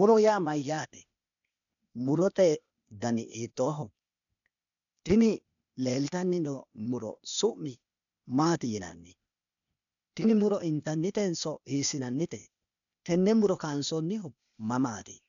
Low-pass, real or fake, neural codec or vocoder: 7.2 kHz; fake; codec, 16 kHz, 0.8 kbps, ZipCodec